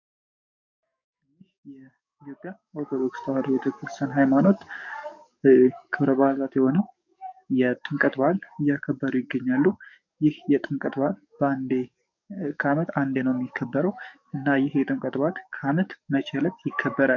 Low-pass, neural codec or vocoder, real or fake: 7.2 kHz; codec, 44.1 kHz, 7.8 kbps, DAC; fake